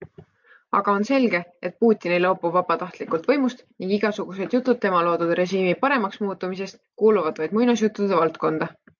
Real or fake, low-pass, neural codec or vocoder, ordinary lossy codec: real; 7.2 kHz; none; MP3, 64 kbps